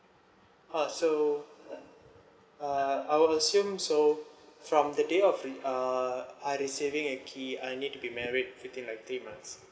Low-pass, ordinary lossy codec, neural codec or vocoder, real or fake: none; none; none; real